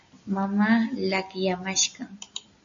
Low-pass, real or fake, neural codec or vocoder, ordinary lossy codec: 7.2 kHz; real; none; MP3, 64 kbps